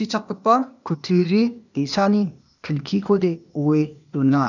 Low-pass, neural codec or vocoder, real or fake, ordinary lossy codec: 7.2 kHz; codec, 16 kHz, 0.8 kbps, ZipCodec; fake; none